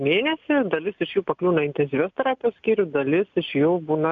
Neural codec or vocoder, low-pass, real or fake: none; 7.2 kHz; real